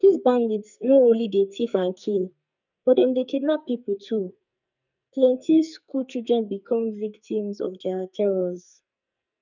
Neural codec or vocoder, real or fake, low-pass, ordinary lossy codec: codec, 44.1 kHz, 2.6 kbps, SNAC; fake; 7.2 kHz; none